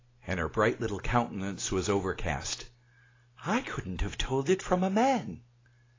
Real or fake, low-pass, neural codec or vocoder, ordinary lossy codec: real; 7.2 kHz; none; AAC, 32 kbps